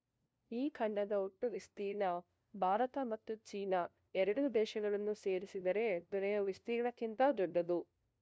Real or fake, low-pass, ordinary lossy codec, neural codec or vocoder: fake; none; none; codec, 16 kHz, 0.5 kbps, FunCodec, trained on LibriTTS, 25 frames a second